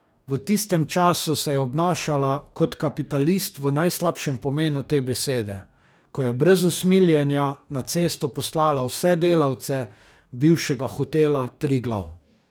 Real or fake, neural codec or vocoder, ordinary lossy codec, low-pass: fake; codec, 44.1 kHz, 2.6 kbps, DAC; none; none